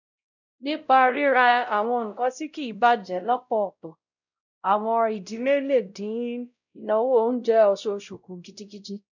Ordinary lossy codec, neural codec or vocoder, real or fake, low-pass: none; codec, 16 kHz, 0.5 kbps, X-Codec, WavLM features, trained on Multilingual LibriSpeech; fake; 7.2 kHz